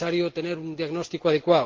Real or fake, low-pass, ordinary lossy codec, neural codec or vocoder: real; 7.2 kHz; Opus, 16 kbps; none